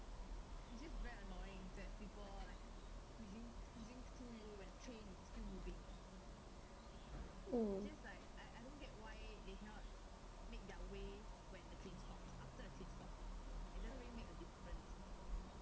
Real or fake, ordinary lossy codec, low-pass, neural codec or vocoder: real; none; none; none